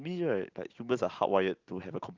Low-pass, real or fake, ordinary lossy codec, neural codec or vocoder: 7.2 kHz; real; Opus, 32 kbps; none